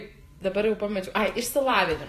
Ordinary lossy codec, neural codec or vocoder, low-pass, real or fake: AAC, 48 kbps; vocoder, 44.1 kHz, 128 mel bands every 512 samples, BigVGAN v2; 14.4 kHz; fake